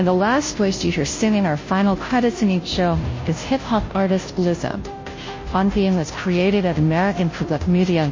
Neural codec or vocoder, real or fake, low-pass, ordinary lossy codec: codec, 16 kHz, 0.5 kbps, FunCodec, trained on Chinese and English, 25 frames a second; fake; 7.2 kHz; MP3, 32 kbps